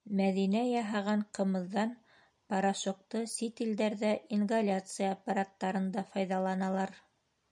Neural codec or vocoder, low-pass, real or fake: none; 10.8 kHz; real